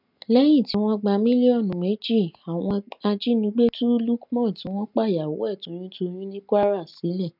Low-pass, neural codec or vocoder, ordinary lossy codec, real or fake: 5.4 kHz; none; none; real